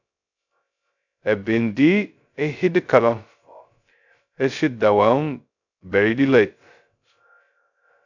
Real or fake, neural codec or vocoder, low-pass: fake; codec, 16 kHz, 0.2 kbps, FocalCodec; 7.2 kHz